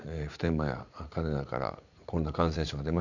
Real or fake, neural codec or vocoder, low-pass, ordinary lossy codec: fake; vocoder, 44.1 kHz, 80 mel bands, Vocos; 7.2 kHz; none